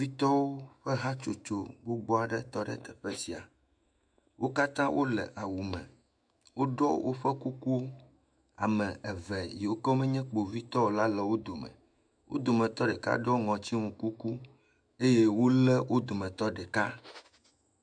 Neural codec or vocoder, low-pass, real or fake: autoencoder, 48 kHz, 128 numbers a frame, DAC-VAE, trained on Japanese speech; 9.9 kHz; fake